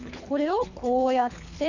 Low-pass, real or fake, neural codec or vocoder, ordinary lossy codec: 7.2 kHz; fake; codec, 24 kHz, 3 kbps, HILCodec; none